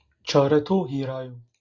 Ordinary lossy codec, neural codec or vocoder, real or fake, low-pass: Opus, 64 kbps; none; real; 7.2 kHz